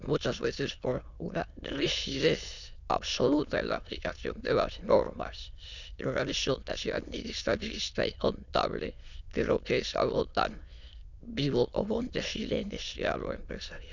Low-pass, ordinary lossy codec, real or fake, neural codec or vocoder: 7.2 kHz; none; fake; autoencoder, 22.05 kHz, a latent of 192 numbers a frame, VITS, trained on many speakers